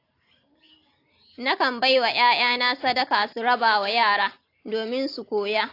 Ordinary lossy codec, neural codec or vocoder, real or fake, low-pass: AAC, 32 kbps; none; real; 5.4 kHz